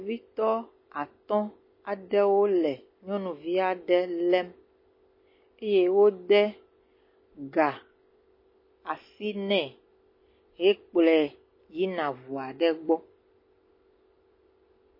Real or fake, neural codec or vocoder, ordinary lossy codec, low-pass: real; none; MP3, 24 kbps; 5.4 kHz